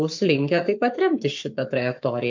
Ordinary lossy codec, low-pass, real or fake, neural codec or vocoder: MP3, 64 kbps; 7.2 kHz; fake; codec, 44.1 kHz, 7.8 kbps, Pupu-Codec